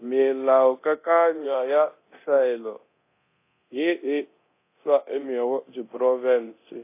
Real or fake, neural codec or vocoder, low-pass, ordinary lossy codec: fake; codec, 24 kHz, 0.9 kbps, DualCodec; 3.6 kHz; AAC, 24 kbps